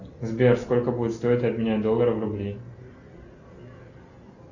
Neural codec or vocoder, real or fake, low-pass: none; real; 7.2 kHz